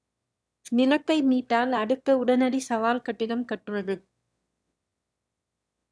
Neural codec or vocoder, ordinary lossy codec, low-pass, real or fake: autoencoder, 22.05 kHz, a latent of 192 numbers a frame, VITS, trained on one speaker; none; none; fake